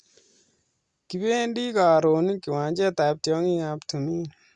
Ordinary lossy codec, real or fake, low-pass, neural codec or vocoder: Opus, 64 kbps; real; 9.9 kHz; none